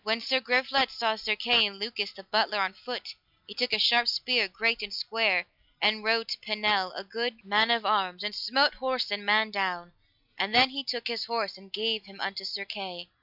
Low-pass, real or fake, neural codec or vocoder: 5.4 kHz; real; none